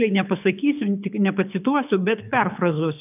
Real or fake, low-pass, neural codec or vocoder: fake; 3.6 kHz; codec, 24 kHz, 6 kbps, HILCodec